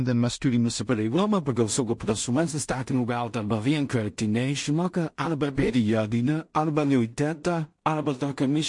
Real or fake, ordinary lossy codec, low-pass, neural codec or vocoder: fake; MP3, 48 kbps; 10.8 kHz; codec, 16 kHz in and 24 kHz out, 0.4 kbps, LongCat-Audio-Codec, two codebook decoder